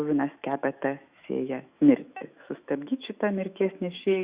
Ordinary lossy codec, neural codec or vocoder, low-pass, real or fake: Opus, 64 kbps; autoencoder, 48 kHz, 128 numbers a frame, DAC-VAE, trained on Japanese speech; 3.6 kHz; fake